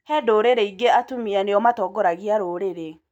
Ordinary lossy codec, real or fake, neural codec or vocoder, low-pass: none; real; none; 19.8 kHz